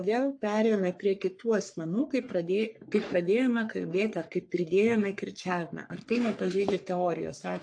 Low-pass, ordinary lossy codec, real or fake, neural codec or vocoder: 9.9 kHz; AAC, 64 kbps; fake; codec, 44.1 kHz, 3.4 kbps, Pupu-Codec